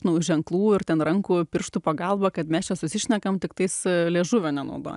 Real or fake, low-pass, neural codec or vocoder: real; 10.8 kHz; none